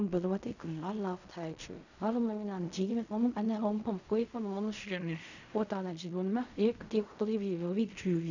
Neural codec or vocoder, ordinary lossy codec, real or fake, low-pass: codec, 16 kHz in and 24 kHz out, 0.4 kbps, LongCat-Audio-Codec, fine tuned four codebook decoder; none; fake; 7.2 kHz